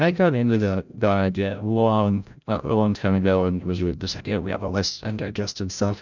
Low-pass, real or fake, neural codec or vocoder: 7.2 kHz; fake; codec, 16 kHz, 0.5 kbps, FreqCodec, larger model